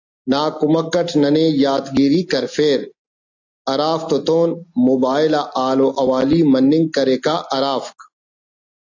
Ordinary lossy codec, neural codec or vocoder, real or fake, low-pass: AAC, 48 kbps; none; real; 7.2 kHz